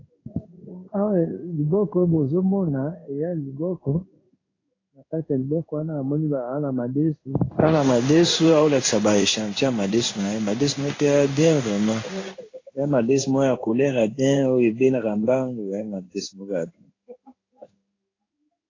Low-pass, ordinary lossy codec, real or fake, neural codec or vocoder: 7.2 kHz; AAC, 32 kbps; fake; codec, 16 kHz in and 24 kHz out, 1 kbps, XY-Tokenizer